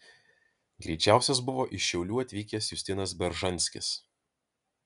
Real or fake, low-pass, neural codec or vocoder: real; 10.8 kHz; none